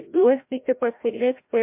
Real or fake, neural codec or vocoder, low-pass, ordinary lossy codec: fake; codec, 16 kHz, 0.5 kbps, FreqCodec, larger model; 3.6 kHz; MP3, 32 kbps